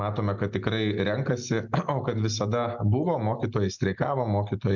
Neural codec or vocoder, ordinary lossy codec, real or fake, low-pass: none; MP3, 64 kbps; real; 7.2 kHz